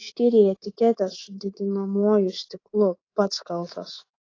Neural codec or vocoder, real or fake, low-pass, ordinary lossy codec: codec, 24 kHz, 3.1 kbps, DualCodec; fake; 7.2 kHz; AAC, 32 kbps